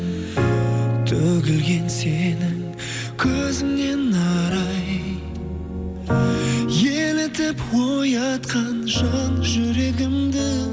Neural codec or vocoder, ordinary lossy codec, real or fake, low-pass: none; none; real; none